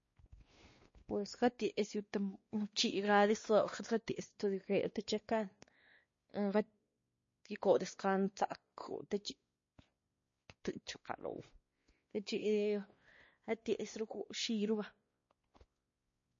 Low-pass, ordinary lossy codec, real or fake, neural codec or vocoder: 7.2 kHz; MP3, 32 kbps; fake; codec, 16 kHz, 2 kbps, X-Codec, WavLM features, trained on Multilingual LibriSpeech